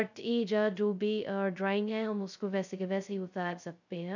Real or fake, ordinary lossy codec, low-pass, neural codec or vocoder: fake; none; 7.2 kHz; codec, 16 kHz, 0.2 kbps, FocalCodec